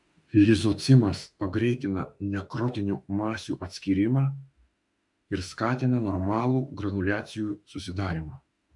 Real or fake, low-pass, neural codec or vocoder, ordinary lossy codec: fake; 10.8 kHz; autoencoder, 48 kHz, 32 numbers a frame, DAC-VAE, trained on Japanese speech; MP3, 64 kbps